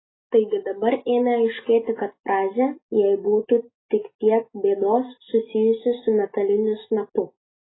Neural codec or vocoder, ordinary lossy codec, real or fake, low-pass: none; AAC, 16 kbps; real; 7.2 kHz